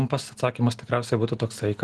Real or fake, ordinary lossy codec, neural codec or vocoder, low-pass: real; Opus, 16 kbps; none; 10.8 kHz